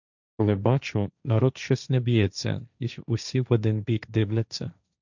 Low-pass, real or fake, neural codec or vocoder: 7.2 kHz; fake; codec, 16 kHz, 1.1 kbps, Voila-Tokenizer